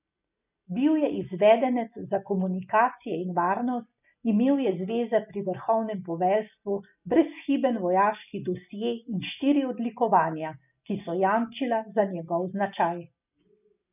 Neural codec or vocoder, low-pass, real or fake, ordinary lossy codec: none; 3.6 kHz; real; none